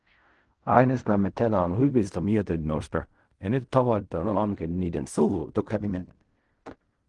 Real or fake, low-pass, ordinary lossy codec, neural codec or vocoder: fake; 10.8 kHz; Opus, 24 kbps; codec, 16 kHz in and 24 kHz out, 0.4 kbps, LongCat-Audio-Codec, fine tuned four codebook decoder